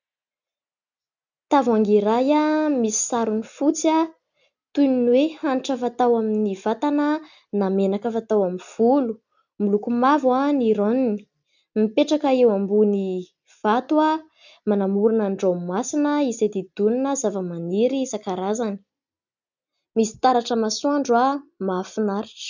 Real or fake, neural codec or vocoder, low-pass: real; none; 7.2 kHz